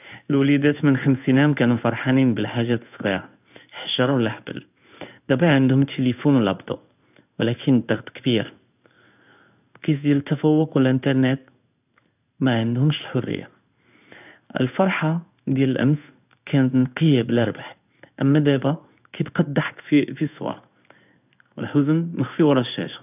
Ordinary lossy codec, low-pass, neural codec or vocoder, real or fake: none; 3.6 kHz; codec, 16 kHz in and 24 kHz out, 1 kbps, XY-Tokenizer; fake